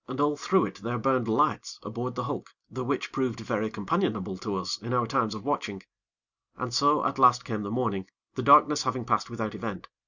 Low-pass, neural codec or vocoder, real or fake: 7.2 kHz; none; real